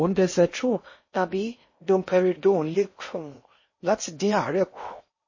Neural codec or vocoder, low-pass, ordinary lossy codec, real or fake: codec, 16 kHz in and 24 kHz out, 0.6 kbps, FocalCodec, streaming, 4096 codes; 7.2 kHz; MP3, 32 kbps; fake